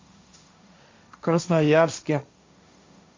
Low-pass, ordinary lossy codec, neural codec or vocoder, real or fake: 7.2 kHz; MP3, 48 kbps; codec, 16 kHz, 1.1 kbps, Voila-Tokenizer; fake